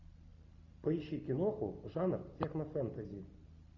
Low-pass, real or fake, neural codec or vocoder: 7.2 kHz; real; none